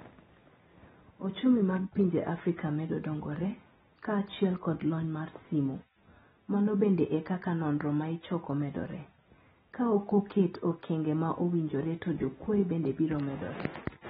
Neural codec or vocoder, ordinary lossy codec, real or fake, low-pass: none; AAC, 16 kbps; real; 7.2 kHz